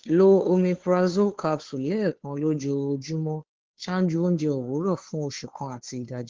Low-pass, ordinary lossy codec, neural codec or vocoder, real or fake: 7.2 kHz; Opus, 16 kbps; codec, 16 kHz, 2 kbps, FunCodec, trained on Chinese and English, 25 frames a second; fake